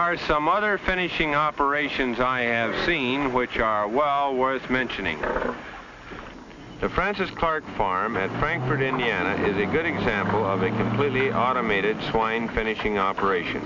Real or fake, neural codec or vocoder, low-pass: real; none; 7.2 kHz